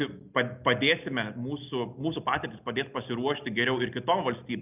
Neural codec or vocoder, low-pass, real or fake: none; 3.6 kHz; real